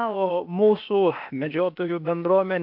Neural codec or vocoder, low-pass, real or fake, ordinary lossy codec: codec, 16 kHz, 0.8 kbps, ZipCodec; 5.4 kHz; fake; MP3, 48 kbps